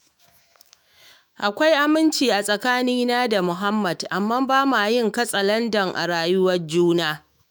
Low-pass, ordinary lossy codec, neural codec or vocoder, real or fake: none; none; autoencoder, 48 kHz, 128 numbers a frame, DAC-VAE, trained on Japanese speech; fake